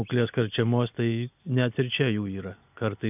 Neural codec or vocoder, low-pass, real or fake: none; 3.6 kHz; real